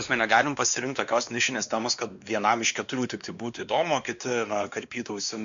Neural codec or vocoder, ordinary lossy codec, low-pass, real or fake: codec, 16 kHz, 2 kbps, X-Codec, WavLM features, trained on Multilingual LibriSpeech; MP3, 64 kbps; 7.2 kHz; fake